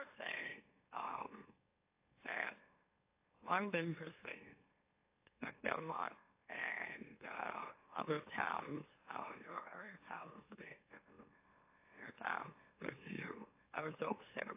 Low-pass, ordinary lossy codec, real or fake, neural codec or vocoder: 3.6 kHz; AAC, 24 kbps; fake; autoencoder, 44.1 kHz, a latent of 192 numbers a frame, MeloTTS